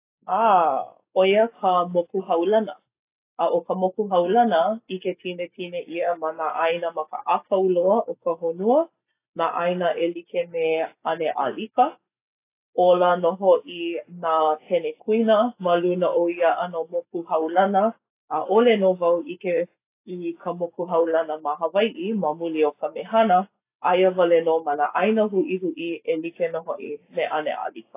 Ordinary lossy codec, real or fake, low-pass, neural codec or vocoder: AAC, 24 kbps; real; 3.6 kHz; none